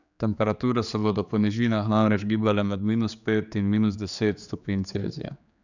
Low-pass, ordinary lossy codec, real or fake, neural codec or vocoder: 7.2 kHz; none; fake; codec, 16 kHz, 4 kbps, X-Codec, HuBERT features, trained on general audio